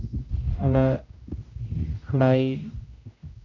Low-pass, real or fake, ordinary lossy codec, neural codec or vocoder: 7.2 kHz; fake; none; codec, 16 kHz, 0.5 kbps, X-Codec, HuBERT features, trained on general audio